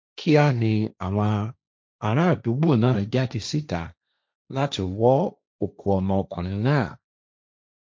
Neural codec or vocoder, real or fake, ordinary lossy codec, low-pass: codec, 16 kHz, 1.1 kbps, Voila-Tokenizer; fake; none; none